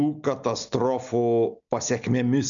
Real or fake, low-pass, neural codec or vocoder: real; 7.2 kHz; none